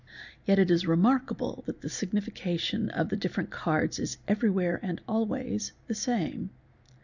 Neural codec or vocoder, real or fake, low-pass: none; real; 7.2 kHz